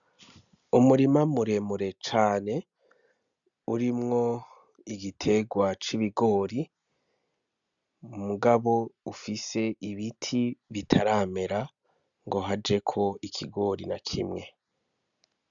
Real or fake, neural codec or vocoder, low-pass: real; none; 7.2 kHz